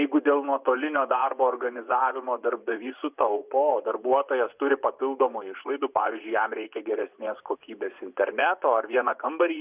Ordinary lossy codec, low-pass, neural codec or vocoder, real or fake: Opus, 64 kbps; 3.6 kHz; vocoder, 24 kHz, 100 mel bands, Vocos; fake